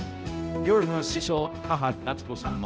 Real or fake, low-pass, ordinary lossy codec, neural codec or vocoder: fake; none; none; codec, 16 kHz, 0.5 kbps, X-Codec, HuBERT features, trained on balanced general audio